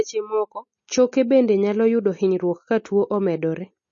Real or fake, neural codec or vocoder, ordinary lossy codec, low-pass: real; none; MP3, 32 kbps; 7.2 kHz